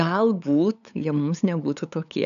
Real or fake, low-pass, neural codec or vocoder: fake; 7.2 kHz; codec, 16 kHz, 8 kbps, FunCodec, trained on LibriTTS, 25 frames a second